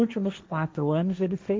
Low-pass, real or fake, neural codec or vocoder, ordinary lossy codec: none; fake; codec, 16 kHz, 1.1 kbps, Voila-Tokenizer; none